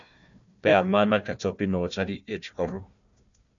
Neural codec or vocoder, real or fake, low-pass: codec, 16 kHz, 1 kbps, FunCodec, trained on Chinese and English, 50 frames a second; fake; 7.2 kHz